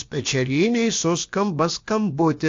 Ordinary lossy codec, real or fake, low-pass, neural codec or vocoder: AAC, 48 kbps; fake; 7.2 kHz; codec, 16 kHz, about 1 kbps, DyCAST, with the encoder's durations